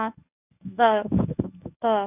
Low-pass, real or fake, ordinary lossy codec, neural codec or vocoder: 3.6 kHz; fake; none; codec, 24 kHz, 0.9 kbps, WavTokenizer, medium speech release version 1